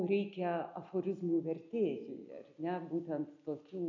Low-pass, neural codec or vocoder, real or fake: 7.2 kHz; none; real